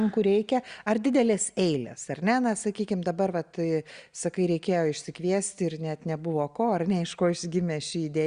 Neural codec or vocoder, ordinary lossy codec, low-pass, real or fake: none; Opus, 64 kbps; 9.9 kHz; real